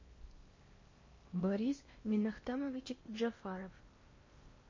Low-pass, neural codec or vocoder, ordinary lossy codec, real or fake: 7.2 kHz; codec, 16 kHz in and 24 kHz out, 0.8 kbps, FocalCodec, streaming, 65536 codes; MP3, 32 kbps; fake